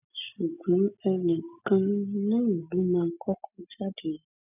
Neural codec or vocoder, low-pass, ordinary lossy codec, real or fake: none; 3.6 kHz; none; real